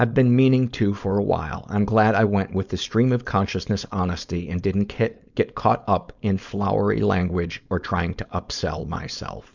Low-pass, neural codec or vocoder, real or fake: 7.2 kHz; codec, 16 kHz, 4.8 kbps, FACodec; fake